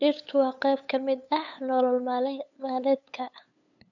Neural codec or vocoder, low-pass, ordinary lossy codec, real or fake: codec, 16 kHz, 8 kbps, FunCodec, trained on LibriTTS, 25 frames a second; 7.2 kHz; none; fake